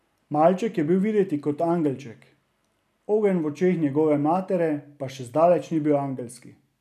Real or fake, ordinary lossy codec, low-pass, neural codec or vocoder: real; none; 14.4 kHz; none